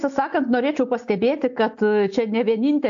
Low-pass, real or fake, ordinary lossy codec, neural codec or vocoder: 7.2 kHz; real; AAC, 64 kbps; none